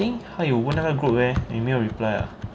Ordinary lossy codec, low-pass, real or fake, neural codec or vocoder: none; none; real; none